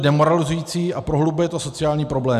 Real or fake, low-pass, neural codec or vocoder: real; 14.4 kHz; none